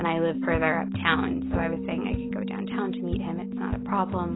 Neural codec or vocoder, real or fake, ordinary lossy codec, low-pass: none; real; AAC, 16 kbps; 7.2 kHz